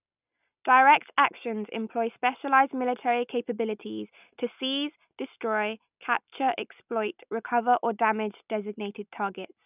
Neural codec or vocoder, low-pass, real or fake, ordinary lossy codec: none; 3.6 kHz; real; none